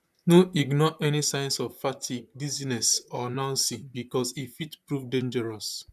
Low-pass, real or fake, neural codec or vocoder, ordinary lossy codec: 14.4 kHz; fake; vocoder, 44.1 kHz, 128 mel bands, Pupu-Vocoder; none